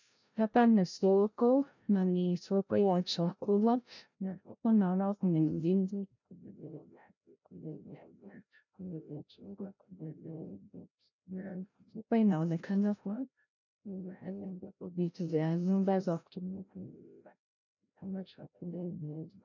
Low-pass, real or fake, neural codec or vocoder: 7.2 kHz; fake; codec, 16 kHz, 0.5 kbps, FreqCodec, larger model